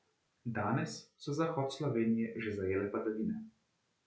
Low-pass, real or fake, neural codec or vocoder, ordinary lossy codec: none; real; none; none